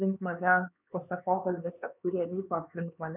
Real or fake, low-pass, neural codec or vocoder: fake; 3.6 kHz; codec, 16 kHz, 4 kbps, X-Codec, HuBERT features, trained on LibriSpeech